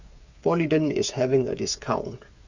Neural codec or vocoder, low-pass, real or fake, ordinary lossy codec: codec, 16 kHz, 8 kbps, FreqCodec, smaller model; 7.2 kHz; fake; Opus, 64 kbps